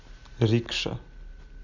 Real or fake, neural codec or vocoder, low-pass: real; none; 7.2 kHz